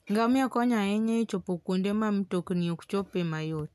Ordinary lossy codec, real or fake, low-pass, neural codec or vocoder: none; real; 14.4 kHz; none